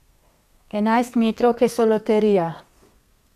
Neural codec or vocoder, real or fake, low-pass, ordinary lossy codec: codec, 32 kHz, 1.9 kbps, SNAC; fake; 14.4 kHz; none